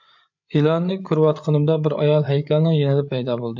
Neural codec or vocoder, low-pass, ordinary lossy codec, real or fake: codec, 16 kHz, 8 kbps, FreqCodec, larger model; 7.2 kHz; MP3, 48 kbps; fake